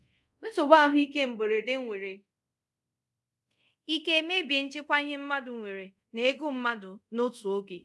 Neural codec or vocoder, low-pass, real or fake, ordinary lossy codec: codec, 24 kHz, 0.5 kbps, DualCodec; none; fake; none